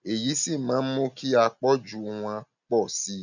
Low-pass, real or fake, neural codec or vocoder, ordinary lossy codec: 7.2 kHz; real; none; none